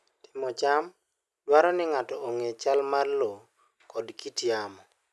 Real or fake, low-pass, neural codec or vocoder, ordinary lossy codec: real; none; none; none